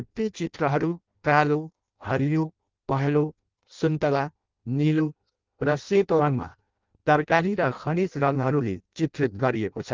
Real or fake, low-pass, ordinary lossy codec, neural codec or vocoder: fake; 7.2 kHz; Opus, 32 kbps; codec, 16 kHz in and 24 kHz out, 0.6 kbps, FireRedTTS-2 codec